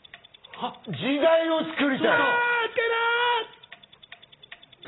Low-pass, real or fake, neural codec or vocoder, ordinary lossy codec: 7.2 kHz; real; none; AAC, 16 kbps